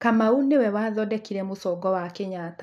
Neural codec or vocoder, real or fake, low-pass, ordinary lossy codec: none; real; 14.4 kHz; none